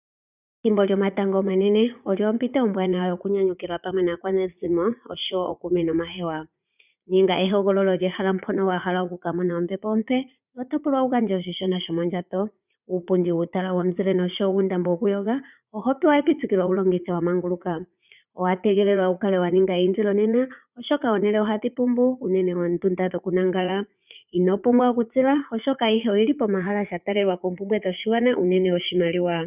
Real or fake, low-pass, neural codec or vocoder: fake; 3.6 kHz; vocoder, 44.1 kHz, 80 mel bands, Vocos